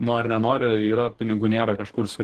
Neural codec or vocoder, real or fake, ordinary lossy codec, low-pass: codec, 44.1 kHz, 2.6 kbps, DAC; fake; Opus, 16 kbps; 14.4 kHz